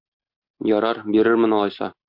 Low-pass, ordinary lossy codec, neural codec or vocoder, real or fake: 5.4 kHz; MP3, 48 kbps; none; real